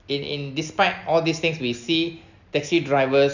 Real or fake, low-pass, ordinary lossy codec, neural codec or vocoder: real; 7.2 kHz; none; none